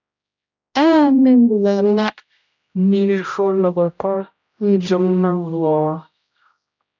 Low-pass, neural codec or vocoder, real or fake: 7.2 kHz; codec, 16 kHz, 0.5 kbps, X-Codec, HuBERT features, trained on general audio; fake